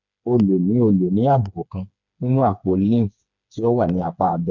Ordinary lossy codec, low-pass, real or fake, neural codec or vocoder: none; 7.2 kHz; fake; codec, 16 kHz, 4 kbps, FreqCodec, smaller model